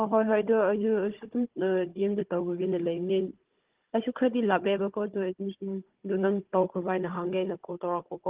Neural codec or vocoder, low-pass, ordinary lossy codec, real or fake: codec, 16 kHz, 16 kbps, FunCodec, trained on Chinese and English, 50 frames a second; 3.6 kHz; Opus, 16 kbps; fake